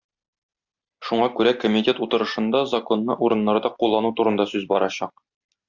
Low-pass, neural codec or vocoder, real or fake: 7.2 kHz; none; real